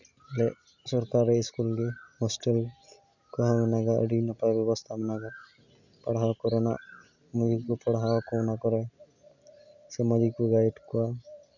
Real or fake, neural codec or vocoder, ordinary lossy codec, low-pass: real; none; none; 7.2 kHz